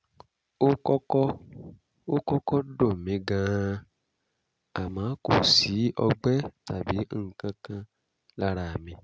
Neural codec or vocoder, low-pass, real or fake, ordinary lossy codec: none; none; real; none